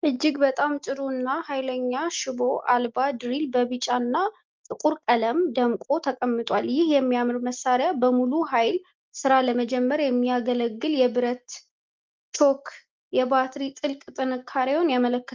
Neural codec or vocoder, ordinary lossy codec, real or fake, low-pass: none; Opus, 24 kbps; real; 7.2 kHz